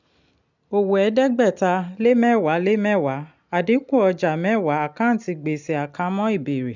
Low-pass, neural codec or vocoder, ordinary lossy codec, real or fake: 7.2 kHz; none; none; real